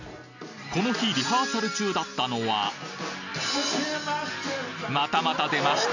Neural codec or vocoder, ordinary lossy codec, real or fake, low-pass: none; none; real; 7.2 kHz